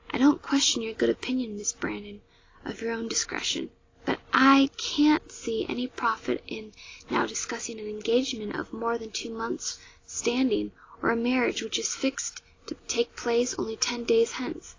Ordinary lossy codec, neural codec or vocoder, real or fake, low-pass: AAC, 32 kbps; none; real; 7.2 kHz